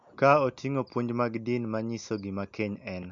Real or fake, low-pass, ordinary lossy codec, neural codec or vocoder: real; 7.2 kHz; MP3, 48 kbps; none